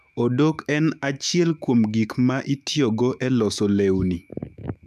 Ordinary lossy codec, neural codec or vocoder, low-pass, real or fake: none; autoencoder, 48 kHz, 128 numbers a frame, DAC-VAE, trained on Japanese speech; 14.4 kHz; fake